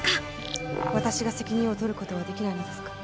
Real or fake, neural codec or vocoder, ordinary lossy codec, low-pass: real; none; none; none